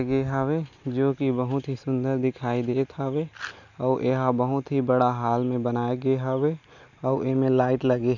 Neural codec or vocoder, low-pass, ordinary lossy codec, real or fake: none; 7.2 kHz; none; real